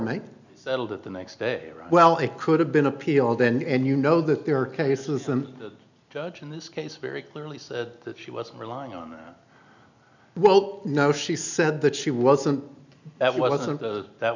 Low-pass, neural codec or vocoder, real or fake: 7.2 kHz; none; real